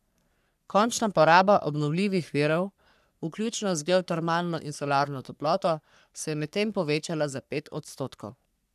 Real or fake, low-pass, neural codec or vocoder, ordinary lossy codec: fake; 14.4 kHz; codec, 44.1 kHz, 3.4 kbps, Pupu-Codec; none